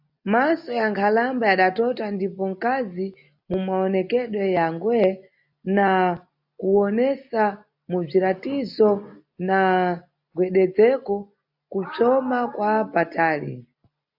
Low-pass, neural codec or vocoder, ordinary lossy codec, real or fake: 5.4 kHz; none; AAC, 48 kbps; real